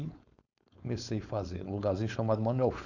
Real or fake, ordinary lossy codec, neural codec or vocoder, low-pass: fake; none; codec, 16 kHz, 4.8 kbps, FACodec; 7.2 kHz